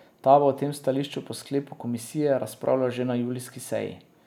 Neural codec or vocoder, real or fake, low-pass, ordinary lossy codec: none; real; 19.8 kHz; none